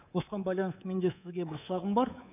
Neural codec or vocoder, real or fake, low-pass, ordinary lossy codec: codec, 24 kHz, 3.1 kbps, DualCodec; fake; 3.6 kHz; none